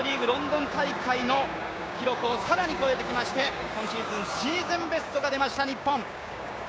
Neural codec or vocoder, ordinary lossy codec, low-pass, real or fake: codec, 16 kHz, 6 kbps, DAC; none; none; fake